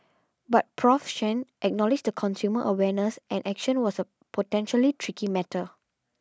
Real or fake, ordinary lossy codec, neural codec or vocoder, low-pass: real; none; none; none